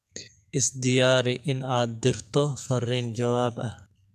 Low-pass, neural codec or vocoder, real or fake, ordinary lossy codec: 14.4 kHz; codec, 44.1 kHz, 2.6 kbps, SNAC; fake; none